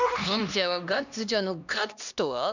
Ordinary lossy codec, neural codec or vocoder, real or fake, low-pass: none; codec, 16 kHz, 1 kbps, X-Codec, HuBERT features, trained on LibriSpeech; fake; 7.2 kHz